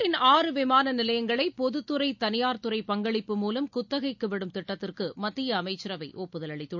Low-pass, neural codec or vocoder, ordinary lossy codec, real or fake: 7.2 kHz; none; none; real